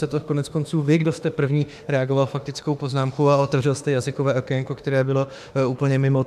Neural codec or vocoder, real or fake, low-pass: autoencoder, 48 kHz, 32 numbers a frame, DAC-VAE, trained on Japanese speech; fake; 14.4 kHz